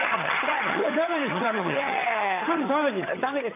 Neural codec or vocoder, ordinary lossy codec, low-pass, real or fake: vocoder, 22.05 kHz, 80 mel bands, HiFi-GAN; none; 3.6 kHz; fake